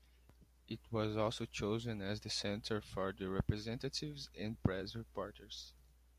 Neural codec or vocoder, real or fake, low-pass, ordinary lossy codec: none; real; 14.4 kHz; AAC, 96 kbps